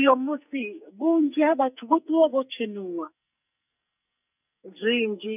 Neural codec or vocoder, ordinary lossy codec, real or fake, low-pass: codec, 44.1 kHz, 2.6 kbps, SNAC; none; fake; 3.6 kHz